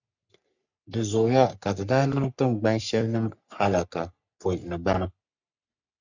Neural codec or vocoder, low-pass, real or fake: codec, 44.1 kHz, 3.4 kbps, Pupu-Codec; 7.2 kHz; fake